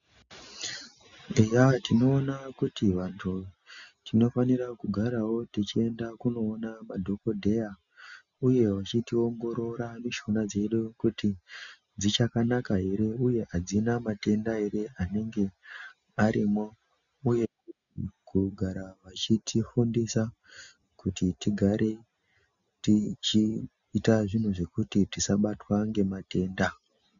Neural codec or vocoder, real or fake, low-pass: none; real; 7.2 kHz